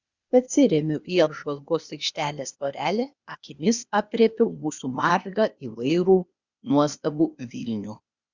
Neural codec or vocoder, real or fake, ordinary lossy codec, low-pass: codec, 16 kHz, 0.8 kbps, ZipCodec; fake; Opus, 64 kbps; 7.2 kHz